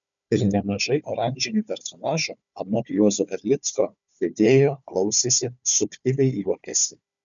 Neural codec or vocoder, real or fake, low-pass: codec, 16 kHz, 4 kbps, FunCodec, trained on Chinese and English, 50 frames a second; fake; 7.2 kHz